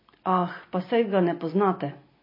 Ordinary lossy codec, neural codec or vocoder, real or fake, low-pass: MP3, 24 kbps; none; real; 5.4 kHz